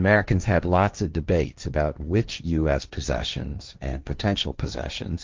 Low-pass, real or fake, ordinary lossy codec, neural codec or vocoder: 7.2 kHz; fake; Opus, 24 kbps; codec, 16 kHz, 1.1 kbps, Voila-Tokenizer